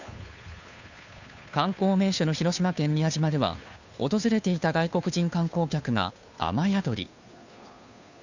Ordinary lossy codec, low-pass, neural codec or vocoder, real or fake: none; 7.2 kHz; codec, 16 kHz, 2 kbps, FunCodec, trained on Chinese and English, 25 frames a second; fake